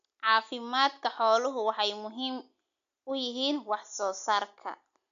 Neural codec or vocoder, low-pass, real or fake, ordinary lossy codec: none; 7.2 kHz; real; MP3, 64 kbps